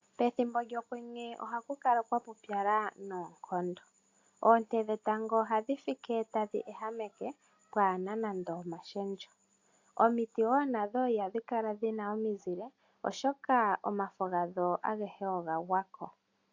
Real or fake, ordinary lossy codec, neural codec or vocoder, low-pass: real; AAC, 48 kbps; none; 7.2 kHz